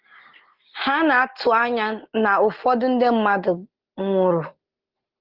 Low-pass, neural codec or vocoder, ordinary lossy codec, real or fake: 5.4 kHz; none; Opus, 16 kbps; real